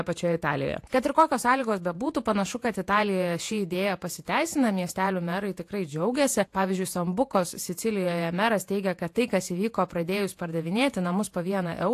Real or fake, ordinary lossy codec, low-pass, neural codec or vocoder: fake; AAC, 64 kbps; 14.4 kHz; vocoder, 48 kHz, 128 mel bands, Vocos